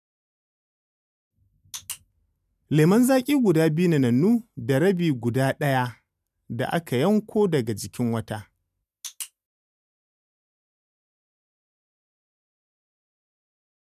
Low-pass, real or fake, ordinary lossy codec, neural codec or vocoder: 14.4 kHz; real; none; none